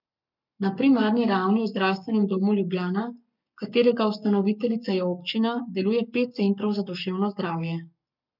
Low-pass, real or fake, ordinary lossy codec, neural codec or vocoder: 5.4 kHz; fake; none; codec, 44.1 kHz, 7.8 kbps, Pupu-Codec